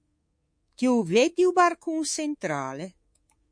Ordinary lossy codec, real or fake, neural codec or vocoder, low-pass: MP3, 48 kbps; fake; codec, 24 kHz, 3.1 kbps, DualCodec; 9.9 kHz